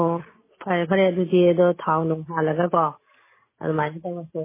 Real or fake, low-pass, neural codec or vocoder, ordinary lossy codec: real; 3.6 kHz; none; MP3, 16 kbps